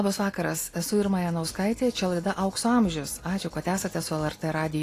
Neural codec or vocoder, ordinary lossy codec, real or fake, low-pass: none; AAC, 48 kbps; real; 14.4 kHz